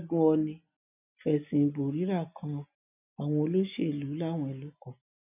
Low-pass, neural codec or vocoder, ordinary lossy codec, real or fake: 3.6 kHz; none; none; real